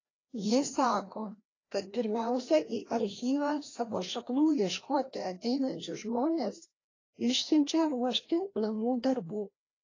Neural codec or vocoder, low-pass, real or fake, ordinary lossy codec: codec, 16 kHz, 1 kbps, FreqCodec, larger model; 7.2 kHz; fake; AAC, 32 kbps